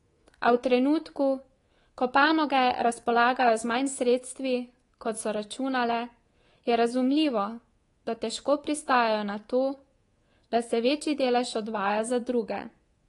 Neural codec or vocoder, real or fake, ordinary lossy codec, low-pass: codec, 24 kHz, 3.1 kbps, DualCodec; fake; AAC, 32 kbps; 10.8 kHz